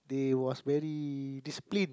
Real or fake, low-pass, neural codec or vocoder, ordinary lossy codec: real; none; none; none